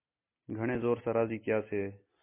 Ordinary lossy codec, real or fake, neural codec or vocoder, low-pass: MP3, 24 kbps; real; none; 3.6 kHz